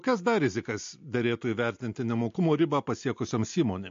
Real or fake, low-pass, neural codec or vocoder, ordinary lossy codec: real; 7.2 kHz; none; MP3, 48 kbps